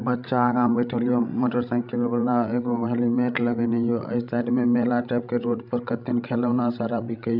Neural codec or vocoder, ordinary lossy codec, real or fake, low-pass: codec, 16 kHz, 16 kbps, FreqCodec, larger model; none; fake; 5.4 kHz